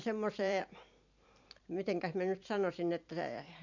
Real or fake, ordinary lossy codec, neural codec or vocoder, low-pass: fake; none; vocoder, 44.1 kHz, 128 mel bands every 512 samples, BigVGAN v2; 7.2 kHz